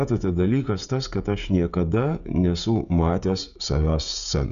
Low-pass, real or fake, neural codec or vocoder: 7.2 kHz; fake; codec, 16 kHz, 16 kbps, FreqCodec, smaller model